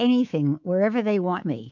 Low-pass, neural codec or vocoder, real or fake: 7.2 kHz; codec, 24 kHz, 3.1 kbps, DualCodec; fake